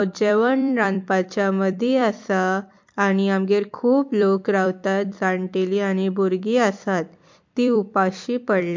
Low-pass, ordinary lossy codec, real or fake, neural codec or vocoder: 7.2 kHz; MP3, 48 kbps; fake; vocoder, 44.1 kHz, 128 mel bands every 256 samples, BigVGAN v2